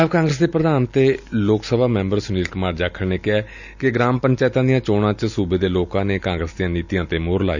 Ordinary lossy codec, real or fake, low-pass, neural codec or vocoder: none; real; 7.2 kHz; none